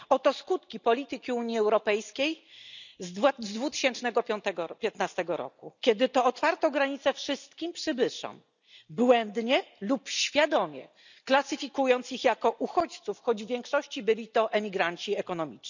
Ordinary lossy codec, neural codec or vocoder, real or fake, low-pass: none; none; real; 7.2 kHz